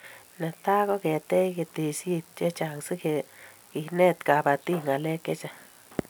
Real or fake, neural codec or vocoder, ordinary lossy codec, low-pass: real; none; none; none